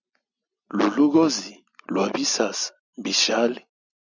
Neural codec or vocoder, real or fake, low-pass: none; real; 7.2 kHz